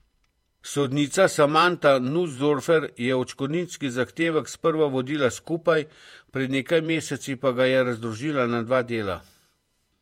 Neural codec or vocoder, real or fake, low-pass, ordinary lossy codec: vocoder, 48 kHz, 128 mel bands, Vocos; fake; 19.8 kHz; MP3, 64 kbps